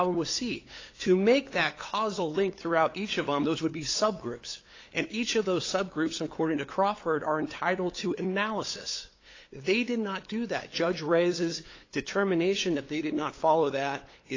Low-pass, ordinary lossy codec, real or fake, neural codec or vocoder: 7.2 kHz; AAC, 32 kbps; fake; codec, 16 kHz, 8 kbps, FunCodec, trained on LibriTTS, 25 frames a second